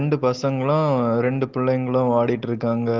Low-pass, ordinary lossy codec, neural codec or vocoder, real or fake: 7.2 kHz; Opus, 16 kbps; none; real